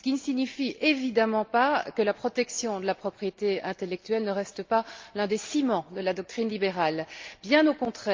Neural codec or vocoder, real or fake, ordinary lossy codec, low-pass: none; real; Opus, 24 kbps; 7.2 kHz